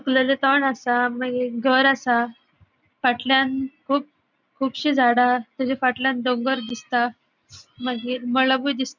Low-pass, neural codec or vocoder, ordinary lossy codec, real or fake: 7.2 kHz; none; none; real